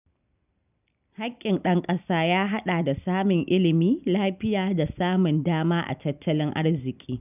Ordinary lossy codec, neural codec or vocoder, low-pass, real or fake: none; none; 3.6 kHz; real